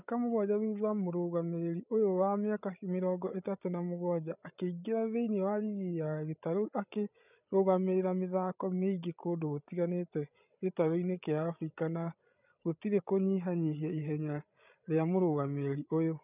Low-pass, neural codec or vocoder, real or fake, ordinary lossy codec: 3.6 kHz; codec, 16 kHz, 8 kbps, FreqCodec, larger model; fake; none